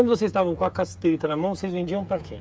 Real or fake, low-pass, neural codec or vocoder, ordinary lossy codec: fake; none; codec, 16 kHz, 8 kbps, FreqCodec, smaller model; none